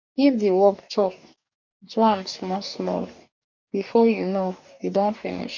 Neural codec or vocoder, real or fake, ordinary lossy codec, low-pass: codec, 44.1 kHz, 2.6 kbps, DAC; fake; none; 7.2 kHz